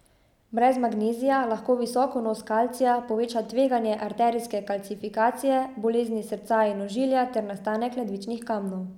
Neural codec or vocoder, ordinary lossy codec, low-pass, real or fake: none; none; 19.8 kHz; real